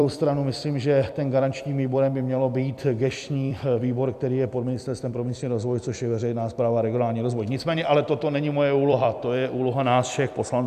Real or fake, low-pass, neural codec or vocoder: fake; 14.4 kHz; vocoder, 44.1 kHz, 128 mel bands every 256 samples, BigVGAN v2